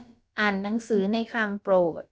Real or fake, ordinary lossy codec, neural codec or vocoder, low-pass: fake; none; codec, 16 kHz, about 1 kbps, DyCAST, with the encoder's durations; none